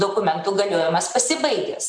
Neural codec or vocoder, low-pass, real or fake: none; 9.9 kHz; real